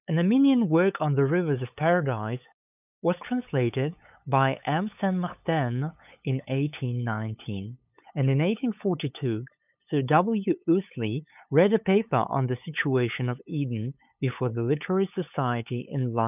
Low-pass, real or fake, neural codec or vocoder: 3.6 kHz; fake; codec, 16 kHz, 8 kbps, FunCodec, trained on LibriTTS, 25 frames a second